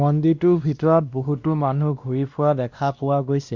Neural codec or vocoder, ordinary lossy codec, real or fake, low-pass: codec, 16 kHz, 1 kbps, X-Codec, WavLM features, trained on Multilingual LibriSpeech; Opus, 64 kbps; fake; 7.2 kHz